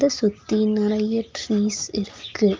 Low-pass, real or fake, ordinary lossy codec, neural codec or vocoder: 7.2 kHz; real; Opus, 32 kbps; none